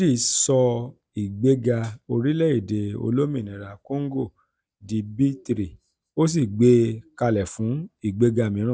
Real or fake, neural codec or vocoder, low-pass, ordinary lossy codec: real; none; none; none